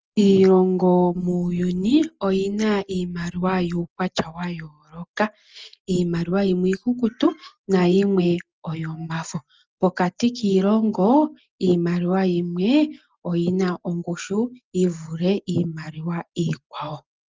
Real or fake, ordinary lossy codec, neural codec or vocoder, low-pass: real; Opus, 24 kbps; none; 7.2 kHz